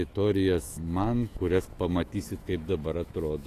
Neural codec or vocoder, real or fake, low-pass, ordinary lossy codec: autoencoder, 48 kHz, 128 numbers a frame, DAC-VAE, trained on Japanese speech; fake; 14.4 kHz; AAC, 48 kbps